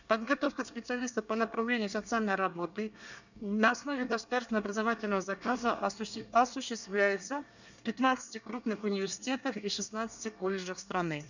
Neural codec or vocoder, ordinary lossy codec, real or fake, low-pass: codec, 24 kHz, 1 kbps, SNAC; none; fake; 7.2 kHz